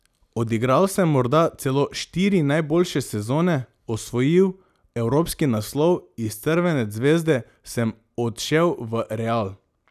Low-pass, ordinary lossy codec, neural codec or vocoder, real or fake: 14.4 kHz; none; none; real